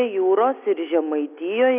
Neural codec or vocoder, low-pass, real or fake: none; 3.6 kHz; real